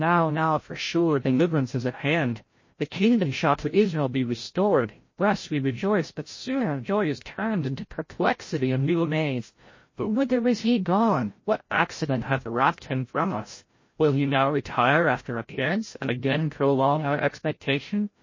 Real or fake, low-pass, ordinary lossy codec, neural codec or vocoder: fake; 7.2 kHz; MP3, 32 kbps; codec, 16 kHz, 0.5 kbps, FreqCodec, larger model